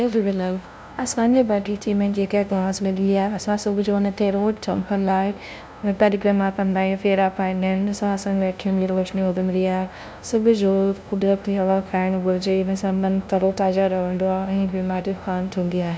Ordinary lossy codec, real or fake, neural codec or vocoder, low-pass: none; fake; codec, 16 kHz, 0.5 kbps, FunCodec, trained on LibriTTS, 25 frames a second; none